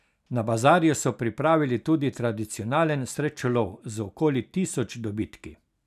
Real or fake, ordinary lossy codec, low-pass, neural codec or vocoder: real; none; 14.4 kHz; none